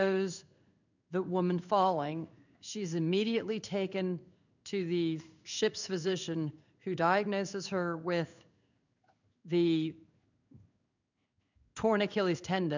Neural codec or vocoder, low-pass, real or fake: codec, 16 kHz in and 24 kHz out, 1 kbps, XY-Tokenizer; 7.2 kHz; fake